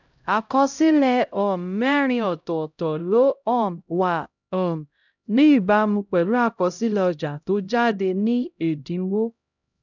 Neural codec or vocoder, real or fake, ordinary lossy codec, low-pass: codec, 16 kHz, 0.5 kbps, X-Codec, HuBERT features, trained on LibriSpeech; fake; none; 7.2 kHz